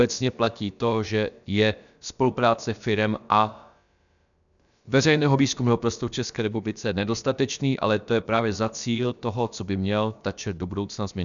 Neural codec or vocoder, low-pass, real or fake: codec, 16 kHz, about 1 kbps, DyCAST, with the encoder's durations; 7.2 kHz; fake